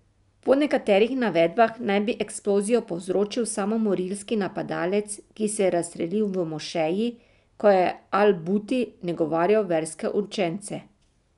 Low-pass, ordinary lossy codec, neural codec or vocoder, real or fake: 10.8 kHz; none; none; real